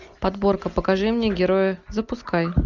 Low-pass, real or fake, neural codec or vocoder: 7.2 kHz; real; none